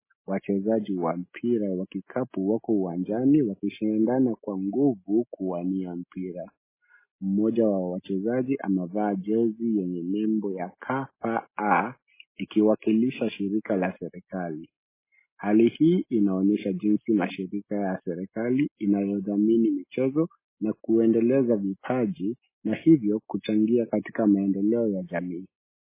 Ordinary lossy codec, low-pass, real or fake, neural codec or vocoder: MP3, 16 kbps; 3.6 kHz; real; none